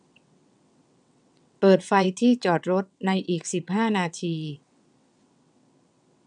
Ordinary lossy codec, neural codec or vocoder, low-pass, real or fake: none; vocoder, 22.05 kHz, 80 mel bands, WaveNeXt; 9.9 kHz; fake